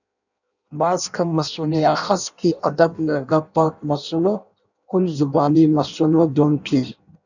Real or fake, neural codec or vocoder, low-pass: fake; codec, 16 kHz in and 24 kHz out, 0.6 kbps, FireRedTTS-2 codec; 7.2 kHz